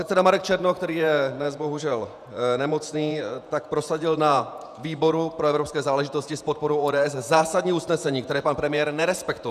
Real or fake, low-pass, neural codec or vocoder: fake; 14.4 kHz; vocoder, 48 kHz, 128 mel bands, Vocos